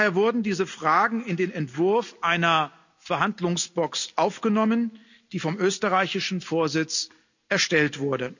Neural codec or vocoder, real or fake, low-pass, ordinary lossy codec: none; real; 7.2 kHz; none